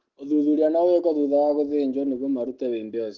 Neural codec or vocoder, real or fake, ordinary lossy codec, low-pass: none; real; Opus, 16 kbps; 7.2 kHz